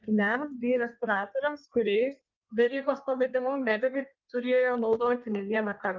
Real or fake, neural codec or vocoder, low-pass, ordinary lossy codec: fake; codec, 16 kHz in and 24 kHz out, 1.1 kbps, FireRedTTS-2 codec; 7.2 kHz; Opus, 24 kbps